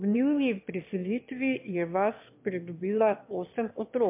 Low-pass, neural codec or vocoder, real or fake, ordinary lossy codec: 3.6 kHz; autoencoder, 22.05 kHz, a latent of 192 numbers a frame, VITS, trained on one speaker; fake; MP3, 32 kbps